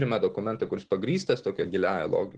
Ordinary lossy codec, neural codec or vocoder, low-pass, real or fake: Opus, 16 kbps; none; 9.9 kHz; real